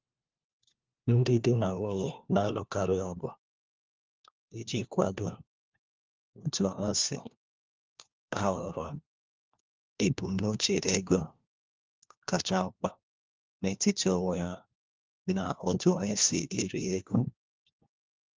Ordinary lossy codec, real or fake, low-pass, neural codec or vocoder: Opus, 32 kbps; fake; 7.2 kHz; codec, 16 kHz, 1 kbps, FunCodec, trained on LibriTTS, 50 frames a second